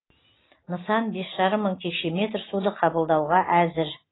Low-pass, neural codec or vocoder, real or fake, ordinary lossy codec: 7.2 kHz; none; real; AAC, 16 kbps